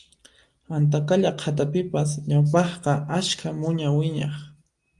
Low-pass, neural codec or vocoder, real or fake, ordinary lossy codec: 10.8 kHz; none; real; Opus, 32 kbps